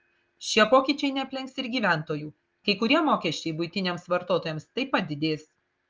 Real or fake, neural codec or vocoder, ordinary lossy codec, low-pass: real; none; Opus, 24 kbps; 7.2 kHz